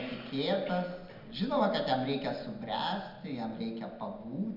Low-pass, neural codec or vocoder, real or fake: 5.4 kHz; none; real